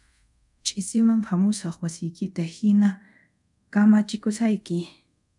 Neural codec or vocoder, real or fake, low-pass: codec, 24 kHz, 0.5 kbps, DualCodec; fake; 10.8 kHz